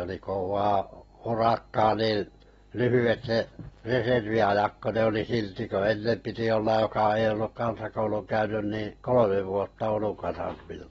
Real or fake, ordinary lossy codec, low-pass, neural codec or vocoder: real; AAC, 24 kbps; 19.8 kHz; none